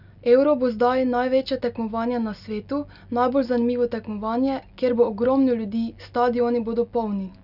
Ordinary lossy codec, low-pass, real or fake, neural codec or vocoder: none; 5.4 kHz; real; none